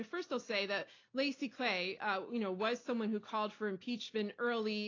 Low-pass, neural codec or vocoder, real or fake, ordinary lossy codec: 7.2 kHz; none; real; AAC, 32 kbps